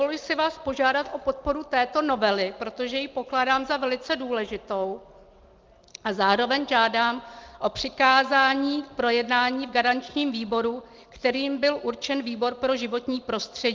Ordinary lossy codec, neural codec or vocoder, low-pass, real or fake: Opus, 32 kbps; none; 7.2 kHz; real